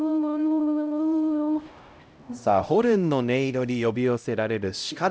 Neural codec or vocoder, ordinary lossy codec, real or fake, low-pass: codec, 16 kHz, 0.5 kbps, X-Codec, HuBERT features, trained on LibriSpeech; none; fake; none